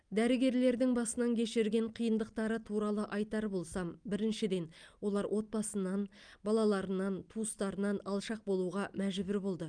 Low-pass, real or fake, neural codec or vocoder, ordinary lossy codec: 9.9 kHz; real; none; Opus, 32 kbps